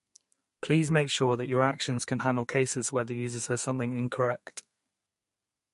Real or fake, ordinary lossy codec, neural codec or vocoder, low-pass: fake; MP3, 48 kbps; codec, 32 kHz, 1.9 kbps, SNAC; 14.4 kHz